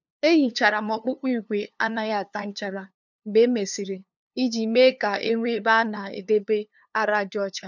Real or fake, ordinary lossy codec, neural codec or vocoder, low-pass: fake; none; codec, 16 kHz, 2 kbps, FunCodec, trained on LibriTTS, 25 frames a second; 7.2 kHz